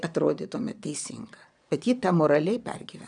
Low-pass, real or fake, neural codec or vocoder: 9.9 kHz; fake; vocoder, 22.05 kHz, 80 mel bands, Vocos